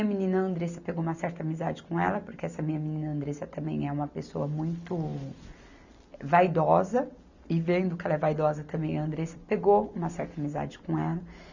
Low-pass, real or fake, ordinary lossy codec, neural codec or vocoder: 7.2 kHz; real; none; none